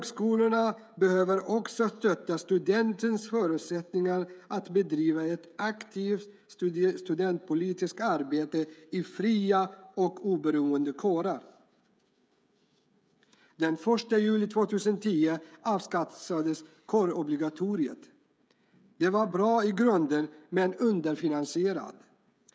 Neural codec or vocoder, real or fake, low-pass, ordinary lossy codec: codec, 16 kHz, 16 kbps, FreqCodec, smaller model; fake; none; none